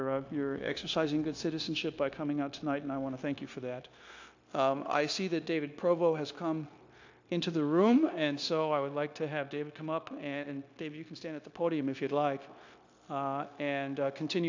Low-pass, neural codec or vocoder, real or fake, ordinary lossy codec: 7.2 kHz; codec, 16 kHz, 0.9 kbps, LongCat-Audio-Codec; fake; AAC, 48 kbps